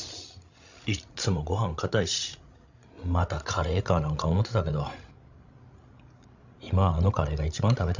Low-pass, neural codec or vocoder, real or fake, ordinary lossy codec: 7.2 kHz; codec, 16 kHz, 16 kbps, FreqCodec, larger model; fake; Opus, 64 kbps